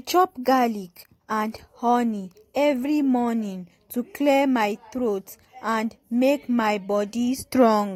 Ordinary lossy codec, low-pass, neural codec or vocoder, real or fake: AAC, 48 kbps; 19.8 kHz; none; real